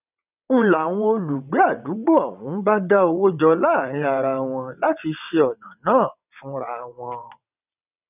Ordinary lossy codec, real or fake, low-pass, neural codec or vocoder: none; fake; 3.6 kHz; vocoder, 22.05 kHz, 80 mel bands, WaveNeXt